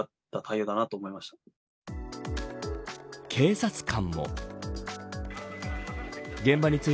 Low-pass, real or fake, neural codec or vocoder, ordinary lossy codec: none; real; none; none